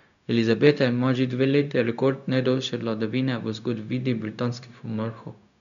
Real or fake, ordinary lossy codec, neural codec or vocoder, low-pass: fake; none; codec, 16 kHz, 0.4 kbps, LongCat-Audio-Codec; 7.2 kHz